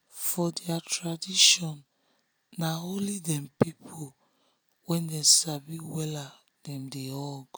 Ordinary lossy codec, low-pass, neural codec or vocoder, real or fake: none; none; none; real